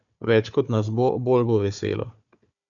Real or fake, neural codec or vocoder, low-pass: fake; codec, 16 kHz, 4 kbps, FunCodec, trained on Chinese and English, 50 frames a second; 7.2 kHz